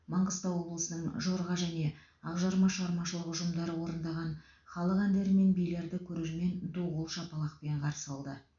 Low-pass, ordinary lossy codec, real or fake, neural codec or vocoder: 7.2 kHz; none; real; none